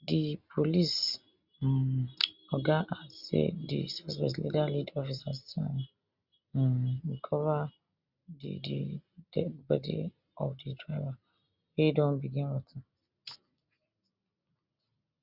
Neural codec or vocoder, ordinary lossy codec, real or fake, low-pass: none; Opus, 64 kbps; real; 5.4 kHz